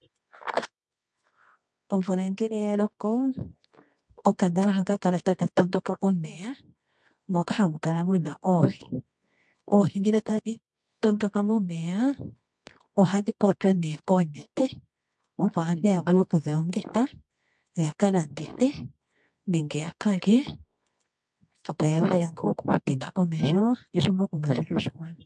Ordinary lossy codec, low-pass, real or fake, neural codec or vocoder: MP3, 64 kbps; 10.8 kHz; fake; codec, 24 kHz, 0.9 kbps, WavTokenizer, medium music audio release